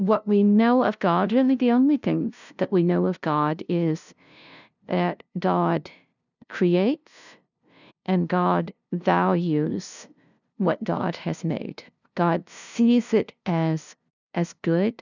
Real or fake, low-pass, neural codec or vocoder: fake; 7.2 kHz; codec, 16 kHz, 0.5 kbps, FunCodec, trained on LibriTTS, 25 frames a second